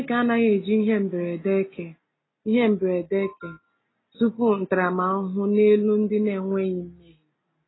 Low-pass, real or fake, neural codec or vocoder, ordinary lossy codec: 7.2 kHz; real; none; AAC, 16 kbps